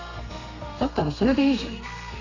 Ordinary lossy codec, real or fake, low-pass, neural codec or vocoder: none; fake; 7.2 kHz; codec, 32 kHz, 1.9 kbps, SNAC